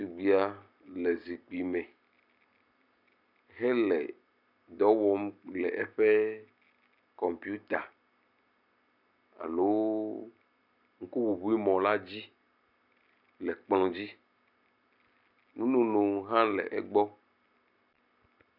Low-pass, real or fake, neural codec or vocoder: 5.4 kHz; real; none